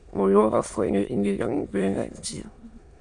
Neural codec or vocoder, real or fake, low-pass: autoencoder, 22.05 kHz, a latent of 192 numbers a frame, VITS, trained on many speakers; fake; 9.9 kHz